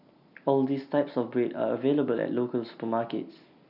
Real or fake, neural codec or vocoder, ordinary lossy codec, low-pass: real; none; none; 5.4 kHz